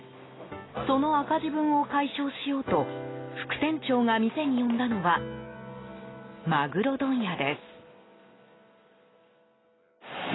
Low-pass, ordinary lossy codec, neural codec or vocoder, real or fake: 7.2 kHz; AAC, 16 kbps; none; real